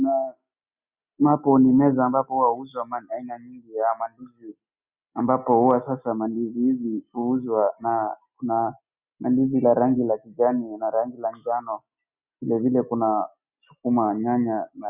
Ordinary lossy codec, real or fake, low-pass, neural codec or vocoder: Opus, 64 kbps; real; 3.6 kHz; none